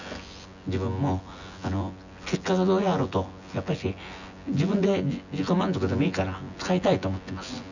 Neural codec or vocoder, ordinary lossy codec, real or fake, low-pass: vocoder, 24 kHz, 100 mel bands, Vocos; none; fake; 7.2 kHz